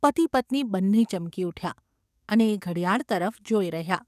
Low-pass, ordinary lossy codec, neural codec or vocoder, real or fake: 14.4 kHz; none; codec, 44.1 kHz, 7.8 kbps, DAC; fake